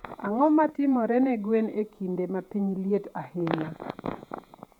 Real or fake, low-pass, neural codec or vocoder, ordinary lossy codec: fake; 19.8 kHz; vocoder, 48 kHz, 128 mel bands, Vocos; none